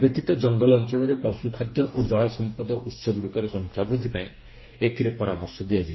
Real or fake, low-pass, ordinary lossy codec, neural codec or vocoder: fake; 7.2 kHz; MP3, 24 kbps; codec, 44.1 kHz, 2.6 kbps, DAC